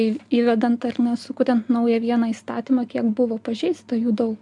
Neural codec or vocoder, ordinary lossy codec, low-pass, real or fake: none; MP3, 96 kbps; 10.8 kHz; real